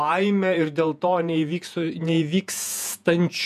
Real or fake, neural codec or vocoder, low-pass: fake; vocoder, 48 kHz, 128 mel bands, Vocos; 14.4 kHz